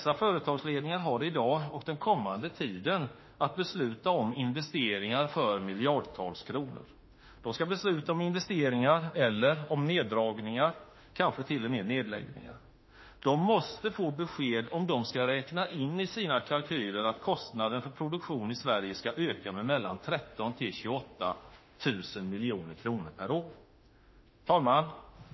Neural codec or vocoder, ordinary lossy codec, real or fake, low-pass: autoencoder, 48 kHz, 32 numbers a frame, DAC-VAE, trained on Japanese speech; MP3, 24 kbps; fake; 7.2 kHz